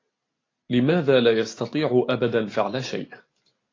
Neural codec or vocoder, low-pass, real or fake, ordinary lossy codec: none; 7.2 kHz; real; AAC, 32 kbps